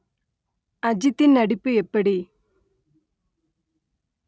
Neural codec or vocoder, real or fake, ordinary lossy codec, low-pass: none; real; none; none